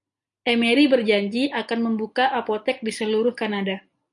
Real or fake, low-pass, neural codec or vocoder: real; 10.8 kHz; none